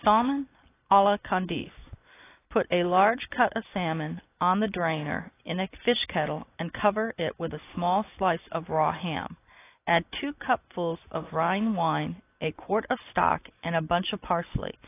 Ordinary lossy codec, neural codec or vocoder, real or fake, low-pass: AAC, 24 kbps; none; real; 3.6 kHz